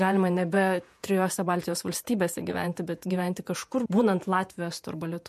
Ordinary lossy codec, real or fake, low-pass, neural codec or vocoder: MP3, 64 kbps; fake; 14.4 kHz; vocoder, 48 kHz, 128 mel bands, Vocos